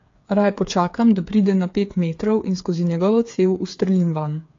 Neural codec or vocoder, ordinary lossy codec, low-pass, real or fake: codec, 16 kHz, 8 kbps, FreqCodec, smaller model; AAC, 48 kbps; 7.2 kHz; fake